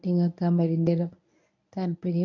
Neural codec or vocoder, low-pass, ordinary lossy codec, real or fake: codec, 24 kHz, 0.9 kbps, WavTokenizer, medium speech release version 1; 7.2 kHz; none; fake